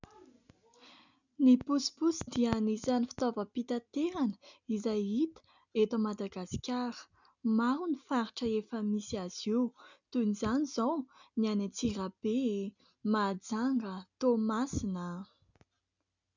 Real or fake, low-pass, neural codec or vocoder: real; 7.2 kHz; none